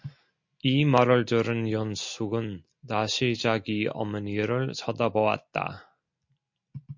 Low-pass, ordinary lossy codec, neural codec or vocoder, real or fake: 7.2 kHz; MP3, 48 kbps; none; real